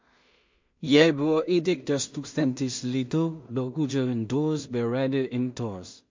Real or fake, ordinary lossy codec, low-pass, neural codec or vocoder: fake; MP3, 48 kbps; 7.2 kHz; codec, 16 kHz in and 24 kHz out, 0.4 kbps, LongCat-Audio-Codec, two codebook decoder